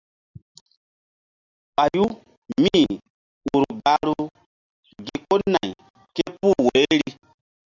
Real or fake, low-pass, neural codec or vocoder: real; 7.2 kHz; none